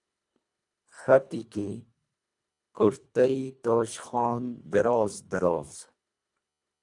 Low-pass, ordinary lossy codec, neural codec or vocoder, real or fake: 10.8 kHz; MP3, 96 kbps; codec, 24 kHz, 1.5 kbps, HILCodec; fake